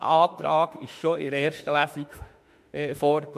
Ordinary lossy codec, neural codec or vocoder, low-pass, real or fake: MP3, 64 kbps; autoencoder, 48 kHz, 32 numbers a frame, DAC-VAE, trained on Japanese speech; 14.4 kHz; fake